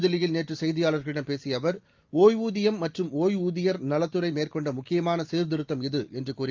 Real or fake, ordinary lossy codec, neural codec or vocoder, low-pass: real; Opus, 24 kbps; none; 7.2 kHz